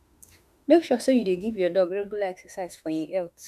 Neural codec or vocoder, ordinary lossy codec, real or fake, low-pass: autoencoder, 48 kHz, 32 numbers a frame, DAC-VAE, trained on Japanese speech; none; fake; 14.4 kHz